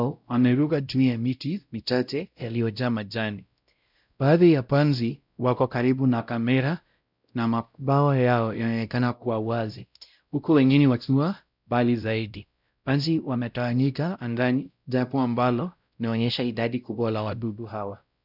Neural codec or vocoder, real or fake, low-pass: codec, 16 kHz, 0.5 kbps, X-Codec, WavLM features, trained on Multilingual LibriSpeech; fake; 5.4 kHz